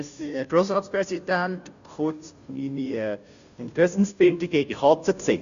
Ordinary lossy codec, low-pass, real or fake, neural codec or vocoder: none; 7.2 kHz; fake; codec, 16 kHz, 0.5 kbps, FunCodec, trained on Chinese and English, 25 frames a second